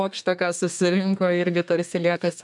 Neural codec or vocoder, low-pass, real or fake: codec, 32 kHz, 1.9 kbps, SNAC; 10.8 kHz; fake